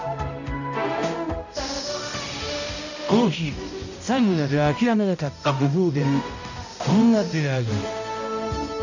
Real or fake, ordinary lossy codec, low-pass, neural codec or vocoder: fake; none; 7.2 kHz; codec, 16 kHz, 0.5 kbps, X-Codec, HuBERT features, trained on balanced general audio